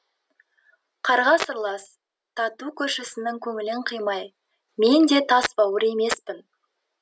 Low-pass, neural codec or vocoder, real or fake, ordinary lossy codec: none; none; real; none